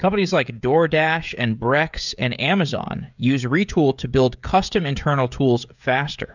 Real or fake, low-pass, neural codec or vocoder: fake; 7.2 kHz; codec, 16 kHz, 16 kbps, FreqCodec, smaller model